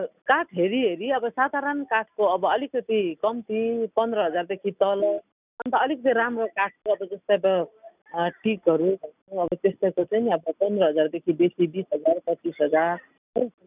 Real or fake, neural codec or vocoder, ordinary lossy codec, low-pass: real; none; none; 3.6 kHz